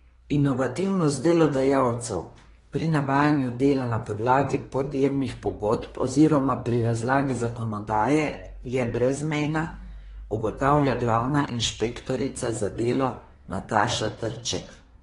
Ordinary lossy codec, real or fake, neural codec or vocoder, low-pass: AAC, 32 kbps; fake; codec, 24 kHz, 1 kbps, SNAC; 10.8 kHz